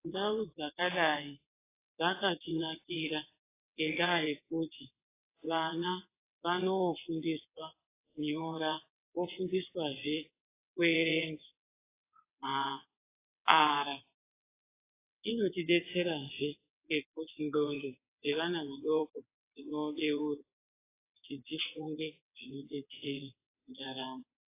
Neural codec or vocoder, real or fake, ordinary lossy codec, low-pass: vocoder, 22.05 kHz, 80 mel bands, WaveNeXt; fake; AAC, 24 kbps; 3.6 kHz